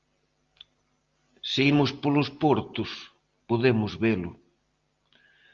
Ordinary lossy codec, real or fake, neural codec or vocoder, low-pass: Opus, 32 kbps; real; none; 7.2 kHz